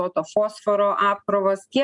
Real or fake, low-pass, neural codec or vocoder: real; 10.8 kHz; none